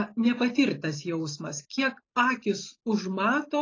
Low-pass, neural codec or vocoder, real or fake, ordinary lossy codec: 7.2 kHz; none; real; AAC, 32 kbps